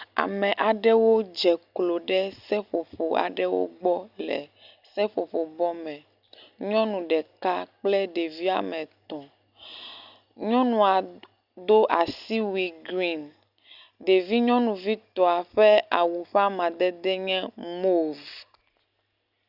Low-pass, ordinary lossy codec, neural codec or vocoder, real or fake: 5.4 kHz; Opus, 64 kbps; none; real